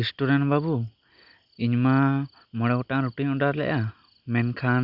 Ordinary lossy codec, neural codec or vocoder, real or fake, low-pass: Opus, 64 kbps; none; real; 5.4 kHz